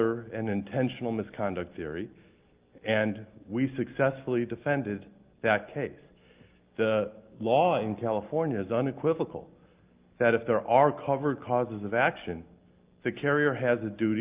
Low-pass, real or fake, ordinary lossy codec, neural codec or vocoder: 3.6 kHz; real; Opus, 32 kbps; none